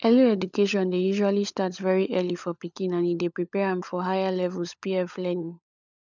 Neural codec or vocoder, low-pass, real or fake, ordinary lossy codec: codec, 16 kHz, 16 kbps, FunCodec, trained on LibriTTS, 50 frames a second; 7.2 kHz; fake; none